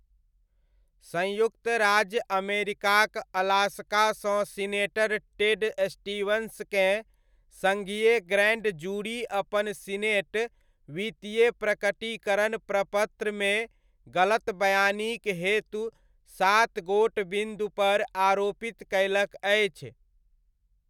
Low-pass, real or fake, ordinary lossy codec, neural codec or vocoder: 19.8 kHz; real; none; none